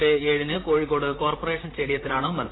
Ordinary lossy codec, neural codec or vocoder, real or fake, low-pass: AAC, 16 kbps; vocoder, 44.1 kHz, 128 mel bands, Pupu-Vocoder; fake; 7.2 kHz